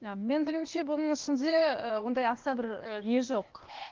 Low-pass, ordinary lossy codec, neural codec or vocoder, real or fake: 7.2 kHz; Opus, 32 kbps; codec, 16 kHz, 0.8 kbps, ZipCodec; fake